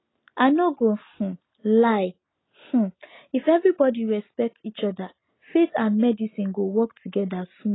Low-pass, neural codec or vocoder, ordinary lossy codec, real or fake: 7.2 kHz; none; AAC, 16 kbps; real